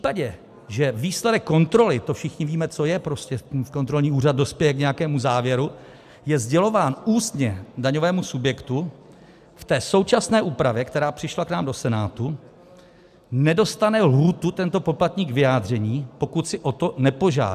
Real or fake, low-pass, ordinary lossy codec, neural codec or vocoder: real; 14.4 kHz; AAC, 96 kbps; none